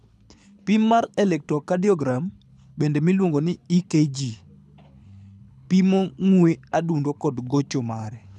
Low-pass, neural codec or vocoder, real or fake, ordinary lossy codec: none; codec, 24 kHz, 6 kbps, HILCodec; fake; none